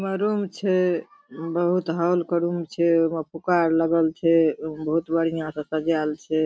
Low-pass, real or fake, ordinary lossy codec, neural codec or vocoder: none; real; none; none